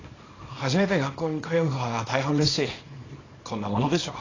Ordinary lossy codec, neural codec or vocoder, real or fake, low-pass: AAC, 32 kbps; codec, 24 kHz, 0.9 kbps, WavTokenizer, small release; fake; 7.2 kHz